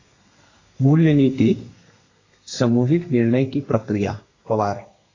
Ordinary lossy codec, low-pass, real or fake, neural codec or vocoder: AAC, 32 kbps; 7.2 kHz; fake; codec, 32 kHz, 1.9 kbps, SNAC